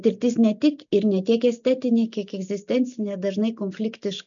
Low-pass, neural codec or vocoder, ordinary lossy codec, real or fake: 7.2 kHz; none; MP3, 96 kbps; real